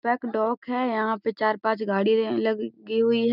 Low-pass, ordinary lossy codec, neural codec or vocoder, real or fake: 5.4 kHz; none; none; real